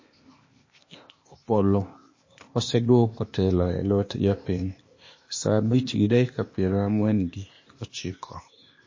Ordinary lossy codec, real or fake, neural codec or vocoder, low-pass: MP3, 32 kbps; fake; codec, 16 kHz, 0.8 kbps, ZipCodec; 7.2 kHz